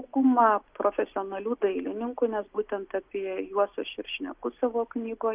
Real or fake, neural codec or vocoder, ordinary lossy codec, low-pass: real; none; Opus, 24 kbps; 3.6 kHz